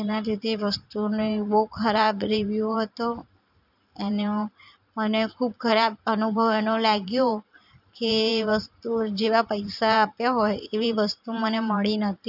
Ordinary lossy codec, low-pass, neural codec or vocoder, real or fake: none; 5.4 kHz; vocoder, 44.1 kHz, 128 mel bands every 512 samples, BigVGAN v2; fake